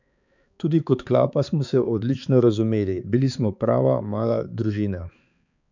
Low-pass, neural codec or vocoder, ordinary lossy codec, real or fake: 7.2 kHz; codec, 16 kHz, 4 kbps, X-Codec, HuBERT features, trained on balanced general audio; none; fake